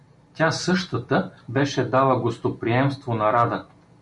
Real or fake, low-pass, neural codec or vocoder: real; 10.8 kHz; none